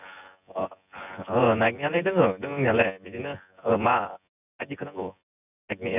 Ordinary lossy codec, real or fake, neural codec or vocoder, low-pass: none; fake; vocoder, 24 kHz, 100 mel bands, Vocos; 3.6 kHz